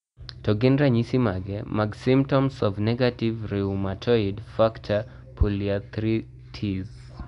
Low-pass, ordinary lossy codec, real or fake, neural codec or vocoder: 10.8 kHz; none; real; none